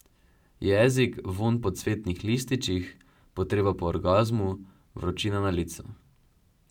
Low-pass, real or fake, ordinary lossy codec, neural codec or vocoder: 19.8 kHz; fake; none; vocoder, 44.1 kHz, 128 mel bands every 512 samples, BigVGAN v2